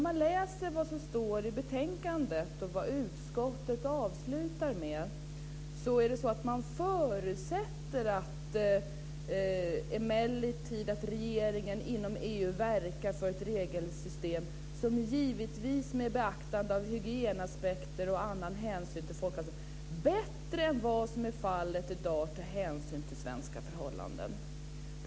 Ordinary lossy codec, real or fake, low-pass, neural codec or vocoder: none; real; none; none